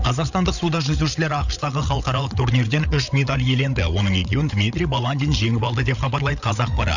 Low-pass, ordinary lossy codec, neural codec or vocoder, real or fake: 7.2 kHz; none; codec, 16 kHz, 8 kbps, FreqCodec, larger model; fake